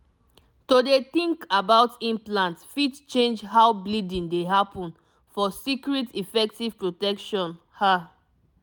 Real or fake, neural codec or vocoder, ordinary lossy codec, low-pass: real; none; none; none